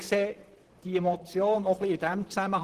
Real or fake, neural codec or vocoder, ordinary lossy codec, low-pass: fake; vocoder, 44.1 kHz, 128 mel bands, Pupu-Vocoder; Opus, 16 kbps; 14.4 kHz